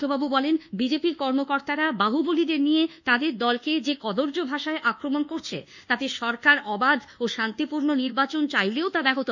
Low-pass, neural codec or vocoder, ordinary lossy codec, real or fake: 7.2 kHz; codec, 24 kHz, 1.2 kbps, DualCodec; none; fake